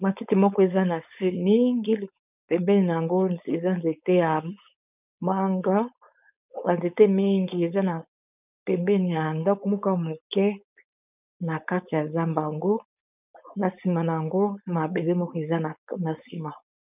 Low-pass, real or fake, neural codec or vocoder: 3.6 kHz; fake; codec, 16 kHz, 4.8 kbps, FACodec